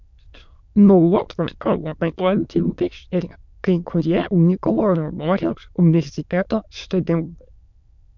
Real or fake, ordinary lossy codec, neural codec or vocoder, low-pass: fake; MP3, 64 kbps; autoencoder, 22.05 kHz, a latent of 192 numbers a frame, VITS, trained on many speakers; 7.2 kHz